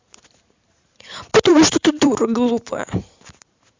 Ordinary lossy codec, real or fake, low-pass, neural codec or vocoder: MP3, 64 kbps; fake; 7.2 kHz; vocoder, 44.1 kHz, 128 mel bands every 512 samples, BigVGAN v2